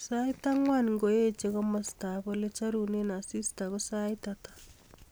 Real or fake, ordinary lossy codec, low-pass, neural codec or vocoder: real; none; none; none